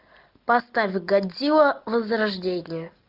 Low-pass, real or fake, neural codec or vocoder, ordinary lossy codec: 5.4 kHz; real; none; Opus, 32 kbps